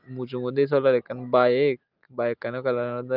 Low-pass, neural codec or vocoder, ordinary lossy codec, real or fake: 5.4 kHz; none; Opus, 24 kbps; real